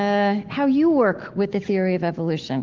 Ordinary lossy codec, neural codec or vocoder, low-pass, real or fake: Opus, 16 kbps; autoencoder, 48 kHz, 128 numbers a frame, DAC-VAE, trained on Japanese speech; 7.2 kHz; fake